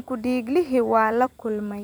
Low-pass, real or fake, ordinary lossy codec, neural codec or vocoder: none; fake; none; vocoder, 44.1 kHz, 128 mel bands every 256 samples, BigVGAN v2